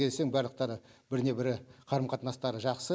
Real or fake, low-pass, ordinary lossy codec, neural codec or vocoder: real; none; none; none